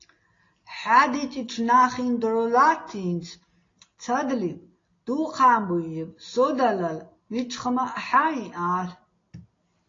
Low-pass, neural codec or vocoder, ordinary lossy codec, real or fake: 7.2 kHz; none; AAC, 32 kbps; real